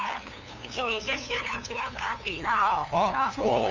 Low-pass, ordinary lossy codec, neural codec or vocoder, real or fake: 7.2 kHz; none; codec, 16 kHz, 2 kbps, FunCodec, trained on LibriTTS, 25 frames a second; fake